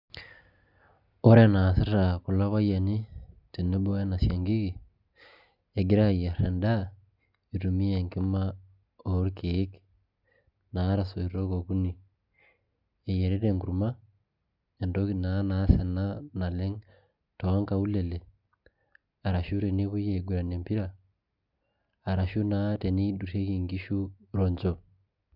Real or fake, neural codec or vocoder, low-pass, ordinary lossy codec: real; none; 5.4 kHz; Opus, 64 kbps